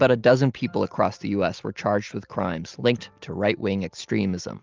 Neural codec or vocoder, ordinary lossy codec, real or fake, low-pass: none; Opus, 32 kbps; real; 7.2 kHz